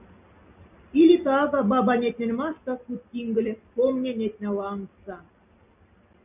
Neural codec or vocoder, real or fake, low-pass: none; real; 3.6 kHz